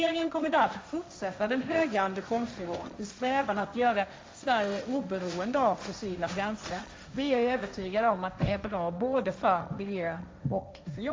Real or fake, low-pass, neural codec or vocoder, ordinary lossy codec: fake; none; codec, 16 kHz, 1.1 kbps, Voila-Tokenizer; none